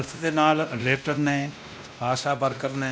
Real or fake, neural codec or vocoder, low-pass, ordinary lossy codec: fake; codec, 16 kHz, 1 kbps, X-Codec, WavLM features, trained on Multilingual LibriSpeech; none; none